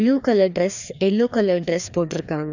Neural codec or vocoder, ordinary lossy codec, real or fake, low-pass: codec, 16 kHz, 2 kbps, FreqCodec, larger model; none; fake; 7.2 kHz